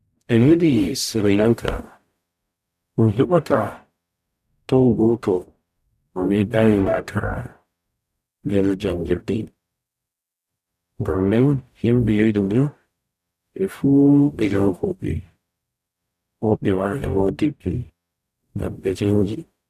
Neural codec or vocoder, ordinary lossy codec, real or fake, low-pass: codec, 44.1 kHz, 0.9 kbps, DAC; none; fake; 14.4 kHz